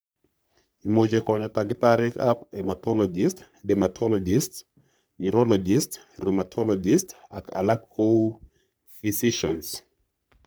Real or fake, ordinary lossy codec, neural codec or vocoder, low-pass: fake; none; codec, 44.1 kHz, 3.4 kbps, Pupu-Codec; none